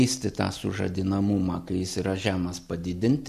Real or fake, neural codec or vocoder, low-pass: real; none; 14.4 kHz